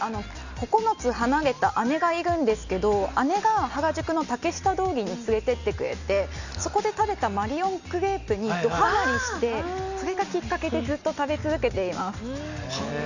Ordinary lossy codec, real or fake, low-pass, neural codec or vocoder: none; real; 7.2 kHz; none